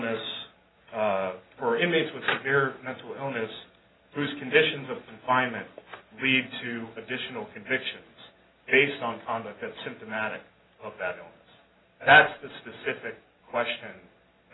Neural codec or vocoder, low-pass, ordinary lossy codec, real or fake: none; 7.2 kHz; AAC, 16 kbps; real